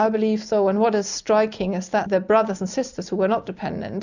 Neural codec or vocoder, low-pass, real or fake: none; 7.2 kHz; real